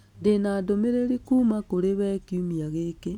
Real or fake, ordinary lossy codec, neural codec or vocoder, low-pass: real; none; none; 19.8 kHz